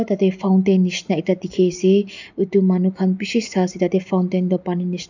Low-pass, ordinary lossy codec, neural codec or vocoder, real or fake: 7.2 kHz; none; none; real